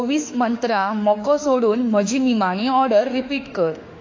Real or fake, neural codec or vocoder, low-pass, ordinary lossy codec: fake; autoencoder, 48 kHz, 32 numbers a frame, DAC-VAE, trained on Japanese speech; 7.2 kHz; AAC, 48 kbps